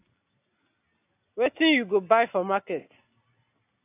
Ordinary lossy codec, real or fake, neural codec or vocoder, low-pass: AAC, 24 kbps; real; none; 3.6 kHz